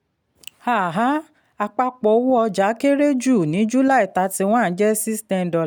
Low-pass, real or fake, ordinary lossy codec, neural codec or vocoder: none; real; none; none